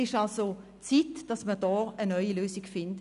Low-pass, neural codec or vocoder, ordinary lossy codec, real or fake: 10.8 kHz; none; none; real